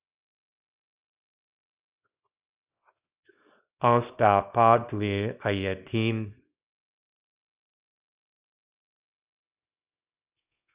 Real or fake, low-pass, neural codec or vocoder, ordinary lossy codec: fake; 3.6 kHz; codec, 24 kHz, 0.9 kbps, WavTokenizer, small release; Opus, 64 kbps